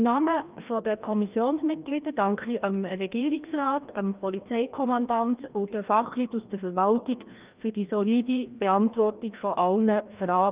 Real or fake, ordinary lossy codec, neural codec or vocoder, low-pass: fake; Opus, 24 kbps; codec, 16 kHz, 1 kbps, FreqCodec, larger model; 3.6 kHz